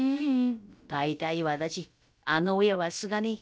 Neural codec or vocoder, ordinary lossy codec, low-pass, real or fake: codec, 16 kHz, about 1 kbps, DyCAST, with the encoder's durations; none; none; fake